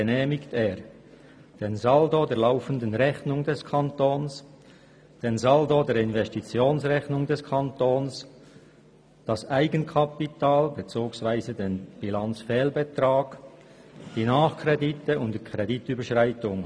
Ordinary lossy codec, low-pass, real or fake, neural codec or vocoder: none; none; real; none